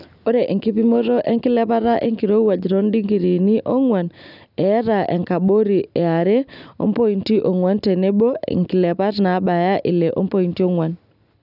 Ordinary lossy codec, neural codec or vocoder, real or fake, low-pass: none; none; real; 5.4 kHz